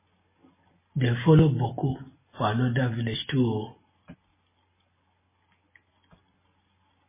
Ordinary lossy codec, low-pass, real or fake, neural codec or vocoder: MP3, 16 kbps; 3.6 kHz; real; none